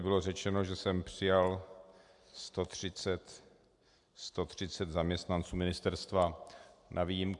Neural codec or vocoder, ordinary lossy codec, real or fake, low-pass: none; AAC, 64 kbps; real; 10.8 kHz